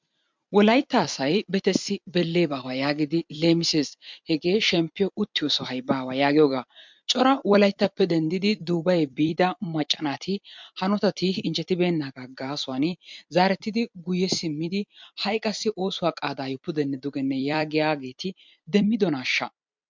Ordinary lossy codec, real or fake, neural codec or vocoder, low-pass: MP3, 64 kbps; fake; vocoder, 44.1 kHz, 128 mel bands every 256 samples, BigVGAN v2; 7.2 kHz